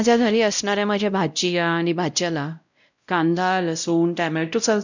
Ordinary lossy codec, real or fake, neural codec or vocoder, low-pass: none; fake; codec, 16 kHz, 0.5 kbps, X-Codec, WavLM features, trained on Multilingual LibriSpeech; 7.2 kHz